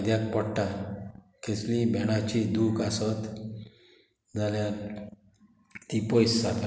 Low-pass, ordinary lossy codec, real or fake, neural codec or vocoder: none; none; real; none